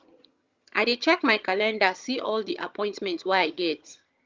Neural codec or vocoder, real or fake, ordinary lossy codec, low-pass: vocoder, 22.05 kHz, 80 mel bands, HiFi-GAN; fake; Opus, 32 kbps; 7.2 kHz